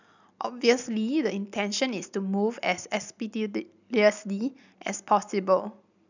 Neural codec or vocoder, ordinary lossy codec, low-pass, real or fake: none; none; 7.2 kHz; real